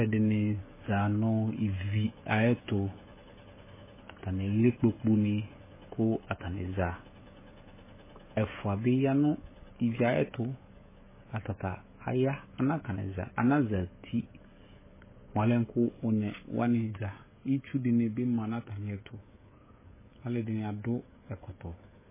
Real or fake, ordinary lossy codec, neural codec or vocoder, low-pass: fake; MP3, 16 kbps; codec, 16 kHz, 16 kbps, FreqCodec, smaller model; 3.6 kHz